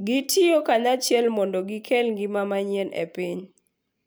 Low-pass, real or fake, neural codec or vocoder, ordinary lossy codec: none; real; none; none